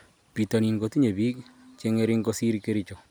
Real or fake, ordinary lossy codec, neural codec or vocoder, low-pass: real; none; none; none